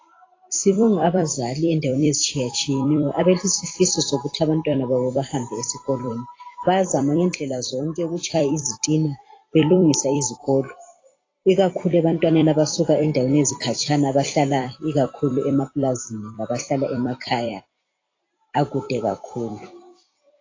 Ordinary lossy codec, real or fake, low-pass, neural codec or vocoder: AAC, 32 kbps; fake; 7.2 kHz; vocoder, 44.1 kHz, 128 mel bands every 256 samples, BigVGAN v2